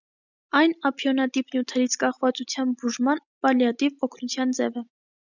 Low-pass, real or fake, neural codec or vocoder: 7.2 kHz; real; none